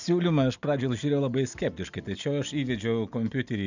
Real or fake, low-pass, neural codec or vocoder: real; 7.2 kHz; none